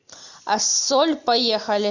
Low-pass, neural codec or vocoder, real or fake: 7.2 kHz; none; real